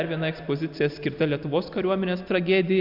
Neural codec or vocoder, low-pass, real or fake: none; 5.4 kHz; real